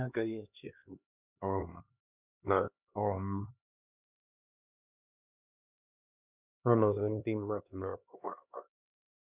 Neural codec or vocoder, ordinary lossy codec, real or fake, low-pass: codec, 16 kHz, 2 kbps, X-Codec, HuBERT features, trained on LibriSpeech; none; fake; 3.6 kHz